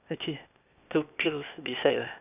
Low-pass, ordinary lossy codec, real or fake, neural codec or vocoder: 3.6 kHz; none; fake; codec, 16 kHz, 0.8 kbps, ZipCodec